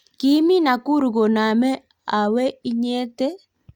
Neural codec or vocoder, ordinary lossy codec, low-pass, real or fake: none; Opus, 64 kbps; 19.8 kHz; real